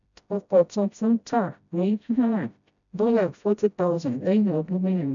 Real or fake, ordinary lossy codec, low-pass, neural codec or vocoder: fake; none; 7.2 kHz; codec, 16 kHz, 0.5 kbps, FreqCodec, smaller model